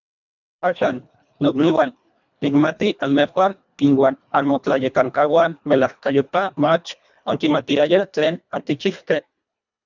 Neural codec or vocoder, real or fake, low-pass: codec, 24 kHz, 1.5 kbps, HILCodec; fake; 7.2 kHz